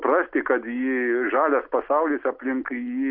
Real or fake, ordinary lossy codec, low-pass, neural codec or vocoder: real; AAC, 48 kbps; 5.4 kHz; none